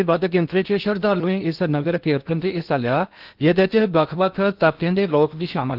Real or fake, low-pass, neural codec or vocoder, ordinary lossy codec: fake; 5.4 kHz; codec, 16 kHz in and 24 kHz out, 0.8 kbps, FocalCodec, streaming, 65536 codes; Opus, 32 kbps